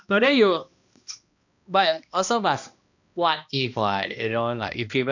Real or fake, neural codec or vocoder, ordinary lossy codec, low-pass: fake; codec, 16 kHz, 1 kbps, X-Codec, HuBERT features, trained on balanced general audio; none; 7.2 kHz